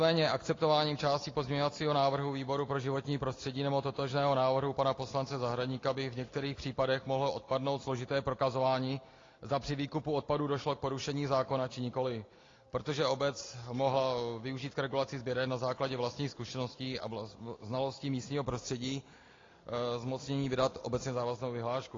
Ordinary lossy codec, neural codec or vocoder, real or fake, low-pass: AAC, 32 kbps; none; real; 7.2 kHz